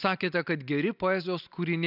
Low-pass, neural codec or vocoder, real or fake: 5.4 kHz; none; real